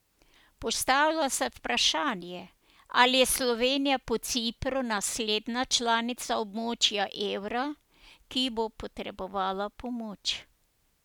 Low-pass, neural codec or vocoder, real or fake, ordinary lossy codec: none; none; real; none